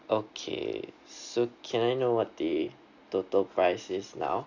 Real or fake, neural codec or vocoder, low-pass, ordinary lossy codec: real; none; 7.2 kHz; Opus, 64 kbps